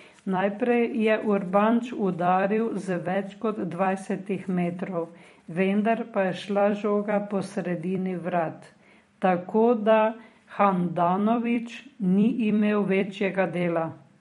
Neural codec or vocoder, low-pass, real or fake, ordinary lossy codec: vocoder, 44.1 kHz, 128 mel bands every 256 samples, BigVGAN v2; 19.8 kHz; fake; MP3, 48 kbps